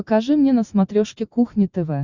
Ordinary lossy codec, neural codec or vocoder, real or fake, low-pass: Opus, 64 kbps; none; real; 7.2 kHz